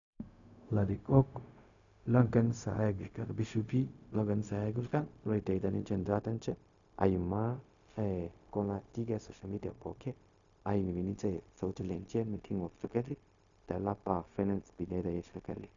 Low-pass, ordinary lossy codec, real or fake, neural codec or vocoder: 7.2 kHz; none; fake; codec, 16 kHz, 0.4 kbps, LongCat-Audio-Codec